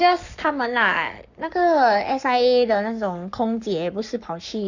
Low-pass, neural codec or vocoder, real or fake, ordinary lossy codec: 7.2 kHz; codec, 44.1 kHz, 7.8 kbps, Pupu-Codec; fake; none